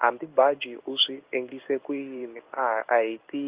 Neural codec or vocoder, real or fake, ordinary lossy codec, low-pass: codec, 16 kHz in and 24 kHz out, 1 kbps, XY-Tokenizer; fake; Opus, 32 kbps; 3.6 kHz